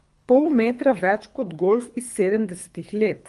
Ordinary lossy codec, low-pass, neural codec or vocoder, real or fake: Opus, 32 kbps; 10.8 kHz; codec, 24 kHz, 3 kbps, HILCodec; fake